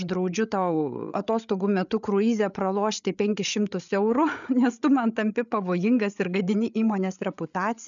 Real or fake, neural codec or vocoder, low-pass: fake; codec, 16 kHz, 8 kbps, FreqCodec, larger model; 7.2 kHz